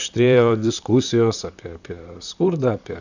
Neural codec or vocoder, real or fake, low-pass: vocoder, 44.1 kHz, 128 mel bands, Pupu-Vocoder; fake; 7.2 kHz